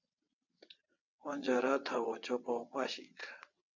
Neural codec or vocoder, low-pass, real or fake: vocoder, 22.05 kHz, 80 mel bands, WaveNeXt; 7.2 kHz; fake